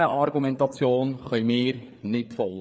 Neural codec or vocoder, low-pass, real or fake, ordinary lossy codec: codec, 16 kHz, 4 kbps, FreqCodec, larger model; none; fake; none